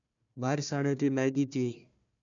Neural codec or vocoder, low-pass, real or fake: codec, 16 kHz, 1 kbps, FunCodec, trained on Chinese and English, 50 frames a second; 7.2 kHz; fake